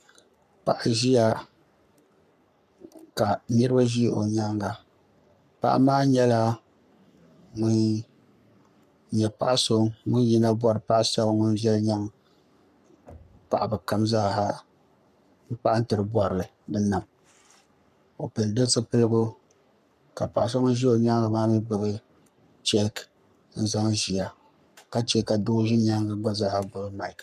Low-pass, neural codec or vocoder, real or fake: 14.4 kHz; codec, 44.1 kHz, 3.4 kbps, Pupu-Codec; fake